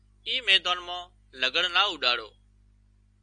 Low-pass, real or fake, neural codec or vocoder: 9.9 kHz; real; none